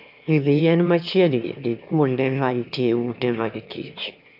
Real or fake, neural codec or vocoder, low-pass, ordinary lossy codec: fake; autoencoder, 22.05 kHz, a latent of 192 numbers a frame, VITS, trained on one speaker; 5.4 kHz; none